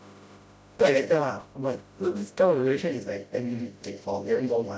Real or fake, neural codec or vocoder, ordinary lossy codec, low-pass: fake; codec, 16 kHz, 0.5 kbps, FreqCodec, smaller model; none; none